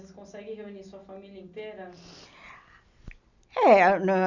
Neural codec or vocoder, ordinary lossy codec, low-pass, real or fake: none; none; 7.2 kHz; real